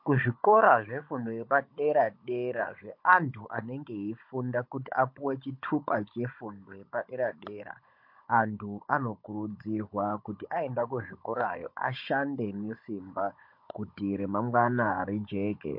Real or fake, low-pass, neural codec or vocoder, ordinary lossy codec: fake; 5.4 kHz; codec, 16 kHz, 16 kbps, FunCodec, trained on Chinese and English, 50 frames a second; MP3, 32 kbps